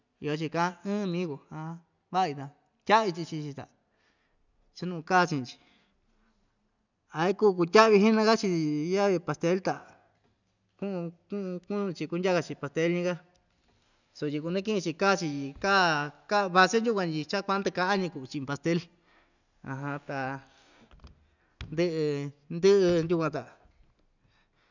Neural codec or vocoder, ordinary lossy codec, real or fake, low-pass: none; none; real; 7.2 kHz